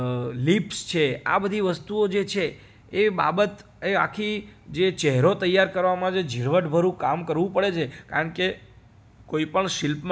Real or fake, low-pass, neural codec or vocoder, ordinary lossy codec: real; none; none; none